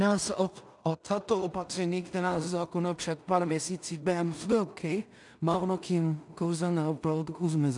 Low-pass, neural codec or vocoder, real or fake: 10.8 kHz; codec, 16 kHz in and 24 kHz out, 0.4 kbps, LongCat-Audio-Codec, two codebook decoder; fake